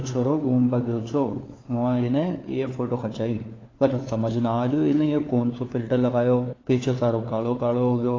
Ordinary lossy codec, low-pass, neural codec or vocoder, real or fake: AAC, 32 kbps; 7.2 kHz; codec, 16 kHz, 4 kbps, FunCodec, trained on LibriTTS, 50 frames a second; fake